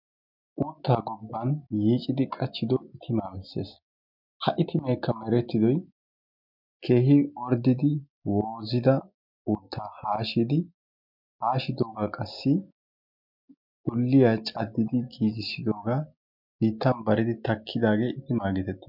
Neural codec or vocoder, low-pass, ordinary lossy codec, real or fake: none; 5.4 kHz; MP3, 48 kbps; real